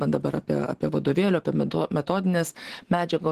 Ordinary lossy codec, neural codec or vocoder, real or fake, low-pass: Opus, 16 kbps; vocoder, 44.1 kHz, 128 mel bands every 512 samples, BigVGAN v2; fake; 14.4 kHz